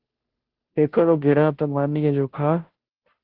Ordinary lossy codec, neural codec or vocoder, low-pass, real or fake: Opus, 16 kbps; codec, 16 kHz, 0.5 kbps, FunCodec, trained on Chinese and English, 25 frames a second; 5.4 kHz; fake